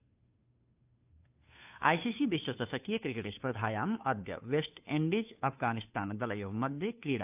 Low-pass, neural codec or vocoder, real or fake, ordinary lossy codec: 3.6 kHz; codec, 16 kHz, 2 kbps, FunCodec, trained on Chinese and English, 25 frames a second; fake; AAC, 32 kbps